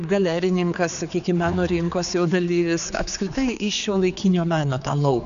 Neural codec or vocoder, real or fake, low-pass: codec, 16 kHz, 4 kbps, X-Codec, HuBERT features, trained on general audio; fake; 7.2 kHz